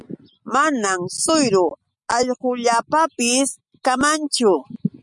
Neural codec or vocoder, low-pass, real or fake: none; 10.8 kHz; real